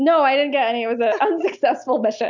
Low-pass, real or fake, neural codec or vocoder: 7.2 kHz; real; none